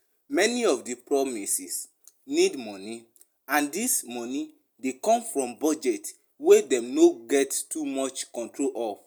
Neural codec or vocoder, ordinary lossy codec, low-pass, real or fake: none; none; none; real